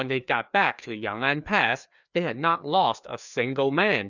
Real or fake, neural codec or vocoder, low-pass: fake; codec, 16 kHz, 2 kbps, FreqCodec, larger model; 7.2 kHz